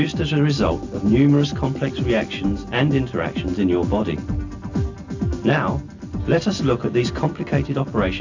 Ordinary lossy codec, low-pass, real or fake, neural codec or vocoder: AAC, 48 kbps; 7.2 kHz; real; none